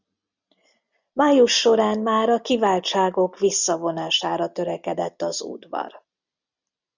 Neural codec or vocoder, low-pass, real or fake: none; 7.2 kHz; real